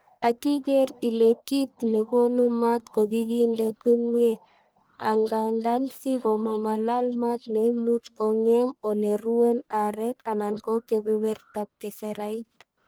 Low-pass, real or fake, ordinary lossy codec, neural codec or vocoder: none; fake; none; codec, 44.1 kHz, 1.7 kbps, Pupu-Codec